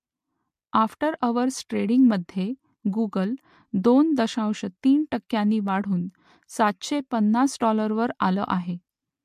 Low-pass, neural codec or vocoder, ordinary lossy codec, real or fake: 14.4 kHz; none; MP3, 64 kbps; real